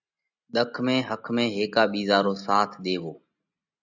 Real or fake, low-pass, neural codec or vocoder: real; 7.2 kHz; none